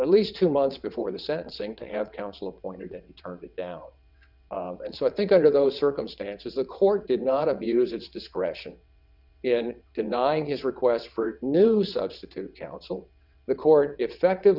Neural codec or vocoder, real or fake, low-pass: vocoder, 22.05 kHz, 80 mel bands, WaveNeXt; fake; 5.4 kHz